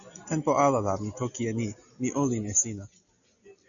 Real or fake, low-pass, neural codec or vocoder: real; 7.2 kHz; none